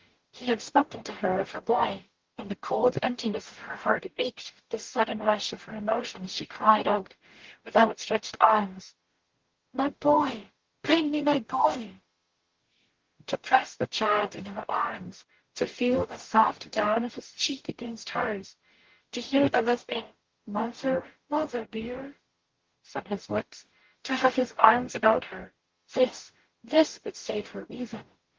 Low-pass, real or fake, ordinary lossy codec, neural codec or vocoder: 7.2 kHz; fake; Opus, 16 kbps; codec, 44.1 kHz, 0.9 kbps, DAC